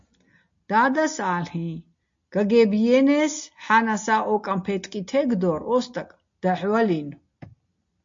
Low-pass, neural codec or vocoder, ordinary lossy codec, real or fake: 7.2 kHz; none; MP3, 48 kbps; real